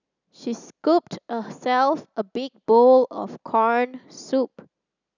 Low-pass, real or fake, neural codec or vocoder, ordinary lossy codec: 7.2 kHz; real; none; none